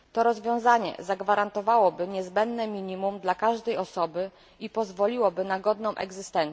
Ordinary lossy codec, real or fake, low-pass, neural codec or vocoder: none; real; none; none